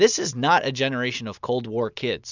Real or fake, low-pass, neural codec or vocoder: real; 7.2 kHz; none